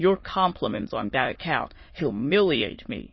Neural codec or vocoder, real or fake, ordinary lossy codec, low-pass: autoencoder, 22.05 kHz, a latent of 192 numbers a frame, VITS, trained on many speakers; fake; MP3, 24 kbps; 7.2 kHz